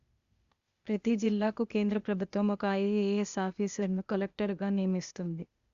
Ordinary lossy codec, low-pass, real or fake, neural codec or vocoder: Opus, 64 kbps; 7.2 kHz; fake; codec, 16 kHz, 0.8 kbps, ZipCodec